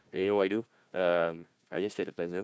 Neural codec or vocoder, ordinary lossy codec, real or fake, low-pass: codec, 16 kHz, 1 kbps, FunCodec, trained on Chinese and English, 50 frames a second; none; fake; none